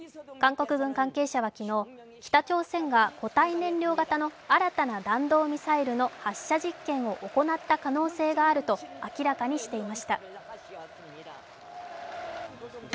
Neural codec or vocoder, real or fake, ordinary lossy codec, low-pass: none; real; none; none